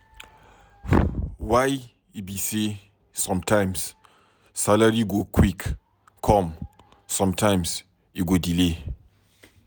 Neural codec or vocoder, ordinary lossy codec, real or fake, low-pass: none; none; real; none